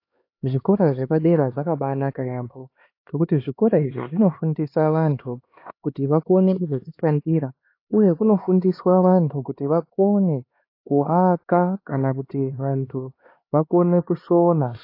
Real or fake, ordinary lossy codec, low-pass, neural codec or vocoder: fake; AAC, 32 kbps; 5.4 kHz; codec, 16 kHz, 2 kbps, X-Codec, HuBERT features, trained on LibriSpeech